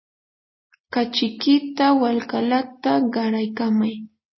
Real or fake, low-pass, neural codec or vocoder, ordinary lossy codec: real; 7.2 kHz; none; MP3, 24 kbps